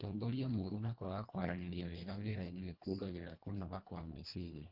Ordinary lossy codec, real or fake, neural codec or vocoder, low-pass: Opus, 32 kbps; fake; codec, 24 kHz, 1.5 kbps, HILCodec; 5.4 kHz